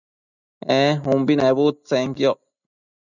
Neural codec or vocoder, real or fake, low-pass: none; real; 7.2 kHz